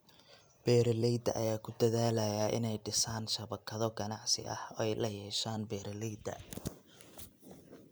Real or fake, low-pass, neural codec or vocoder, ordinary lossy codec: real; none; none; none